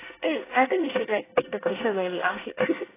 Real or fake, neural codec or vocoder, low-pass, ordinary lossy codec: fake; codec, 24 kHz, 1 kbps, SNAC; 3.6 kHz; AAC, 16 kbps